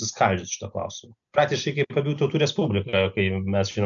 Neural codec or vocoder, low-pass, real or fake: none; 7.2 kHz; real